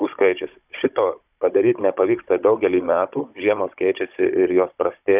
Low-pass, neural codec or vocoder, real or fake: 3.6 kHz; codec, 16 kHz, 16 kbps, FunCodec, trained on Chinese and English, 50 frames a second; fake